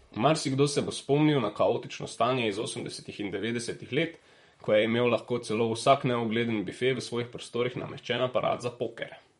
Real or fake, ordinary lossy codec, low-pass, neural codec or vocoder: fake; MP3, 48 kbps; 19.8 kHz; vocoder, 44.1 kHz, 128 mel bands, Pupu-Vocoder